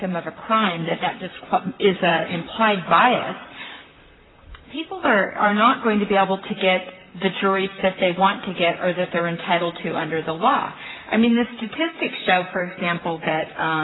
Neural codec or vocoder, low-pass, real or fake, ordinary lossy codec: vocoder, 44.1 kHz, 128 mel bands, Pupu-Vocoder; 7.2 kHz; fake; AAC, 16 kbps